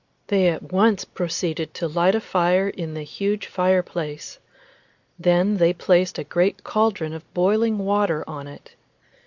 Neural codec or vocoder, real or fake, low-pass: none; real; 7.2 kHz